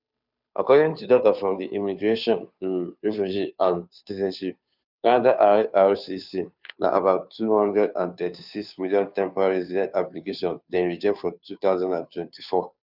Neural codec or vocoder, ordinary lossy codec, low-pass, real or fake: codec, 16 kHz, 2 kbps, FunCodec, trained on Chinese and English, 25 frames a second; none; 5.4 kHz; fake